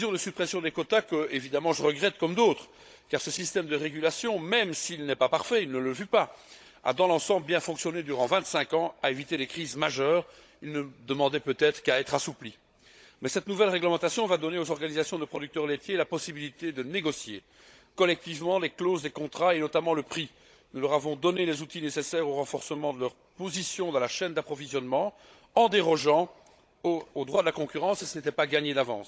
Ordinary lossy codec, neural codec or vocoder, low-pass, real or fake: none; codec, 16 kHz, 16 kbps, FunCodec, trained on Chinese and English, 50 frames a second; none; fake